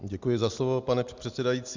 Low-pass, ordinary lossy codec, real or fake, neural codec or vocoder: 7.2 kHz; Opus, 64 kbps; real; none